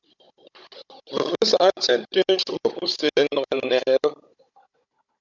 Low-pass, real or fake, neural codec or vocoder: 7.2 kHz; fake; codec, 16 kHz, 16 kbps, FunCodec, trained on Chinese and English, 50 frames a second